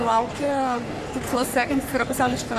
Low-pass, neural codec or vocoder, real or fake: 14.4 kHz; codec, 44.1 kHz, 3.4 kbps, Pupu-Codec; fake